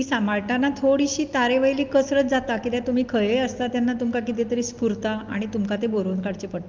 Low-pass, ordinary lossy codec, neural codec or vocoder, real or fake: 7.2 kHz; Opus, 32 kbps; none; real